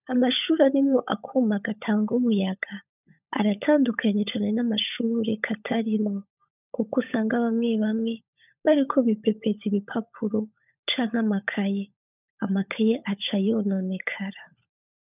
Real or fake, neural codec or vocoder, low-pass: fake; codec, 16 kHz, 16 kbps, FunCodec, trained on LibriTTS, 50 frames a second; 3.6 kHz